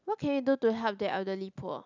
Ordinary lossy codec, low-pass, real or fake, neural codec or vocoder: none; 7.2 kHz; real; none